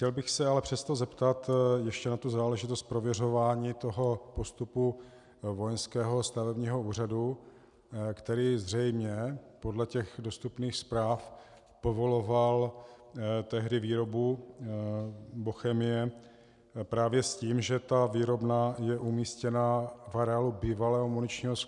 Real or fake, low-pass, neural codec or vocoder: real; 10.8 kHz; none